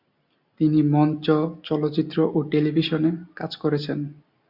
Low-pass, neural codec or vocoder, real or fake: 5.4 kHz; none; real